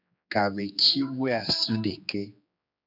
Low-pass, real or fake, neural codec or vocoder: 5.4 kHz; fake; codec, 16 kHz, 2 kbps, X-Codec, HuBERT features, trained on general audio